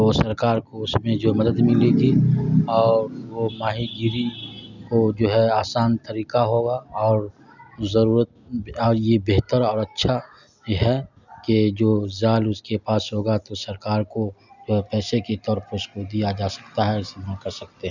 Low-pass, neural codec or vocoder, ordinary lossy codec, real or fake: 7.2 kHz; none; none; real